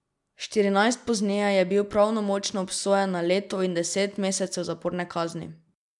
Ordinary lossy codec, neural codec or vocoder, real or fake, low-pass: none; none; real; 10.8 kHz